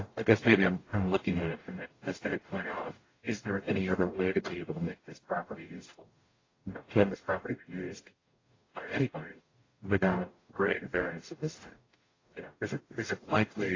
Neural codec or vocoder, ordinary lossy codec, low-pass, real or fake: codec, 44.1 kHz, 0.9 kbps, DAC; AAC, 32 kbps; 7.2 kHz; fake